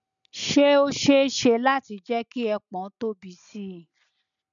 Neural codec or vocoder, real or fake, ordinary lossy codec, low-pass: none; real; none; 7.2 kHz